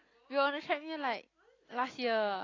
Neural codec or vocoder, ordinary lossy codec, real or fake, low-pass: none; AAC, 32 kbps; real; 7.2 kHz